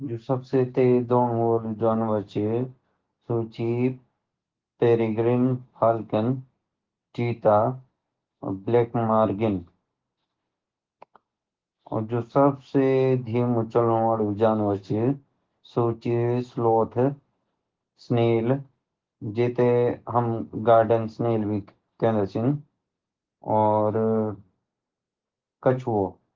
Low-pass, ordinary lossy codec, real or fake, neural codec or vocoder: 7.2 kHz; Opus, 16 kbps; real; none